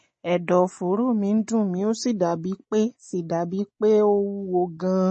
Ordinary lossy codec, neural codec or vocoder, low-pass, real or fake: MP3, 32 kbps; none; 10.8 kHz; real